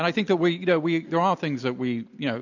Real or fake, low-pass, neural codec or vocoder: real; 7.2 kHz; none